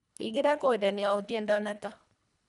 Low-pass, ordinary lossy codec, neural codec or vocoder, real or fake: 10.8 kHz; none; codec, 24 kHz, 1.5 kbps, HILCodec; fake